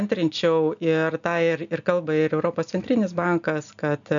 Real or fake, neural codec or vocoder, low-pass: real; none; 7.2 kHz